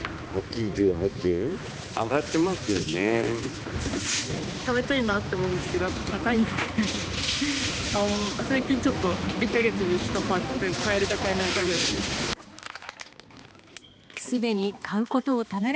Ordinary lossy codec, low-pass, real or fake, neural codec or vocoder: none; none; fake; codec, 16 kHz, 2 kbps, X-Codec, HuBERT features, trained on balanced general audio